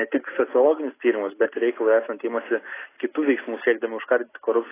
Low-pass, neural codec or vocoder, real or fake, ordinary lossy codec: 3.6 kHz; none; real; AAC, 16 kbps